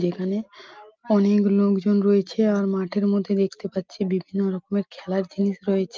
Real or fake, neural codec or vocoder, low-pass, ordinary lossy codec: real; none; 7.2 kHz; Opus, 24 kbps